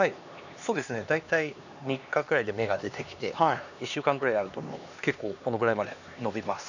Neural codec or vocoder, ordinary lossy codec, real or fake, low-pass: codec, 16 kHz, 2 kbps, X-Codec, HuBERT features, trained on LibriSpeech; none; fake; 7.2 kHz